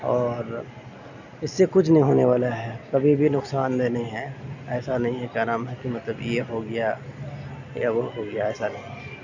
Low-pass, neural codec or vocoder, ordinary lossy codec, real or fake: 7.2 kHz; none; none; real